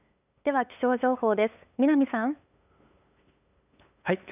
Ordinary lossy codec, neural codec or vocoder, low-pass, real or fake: none; codec, 16 kHz, 8 kbps, FunCodec, trained on LibriTTS, 25 frames a second; 3.6 kHz; fake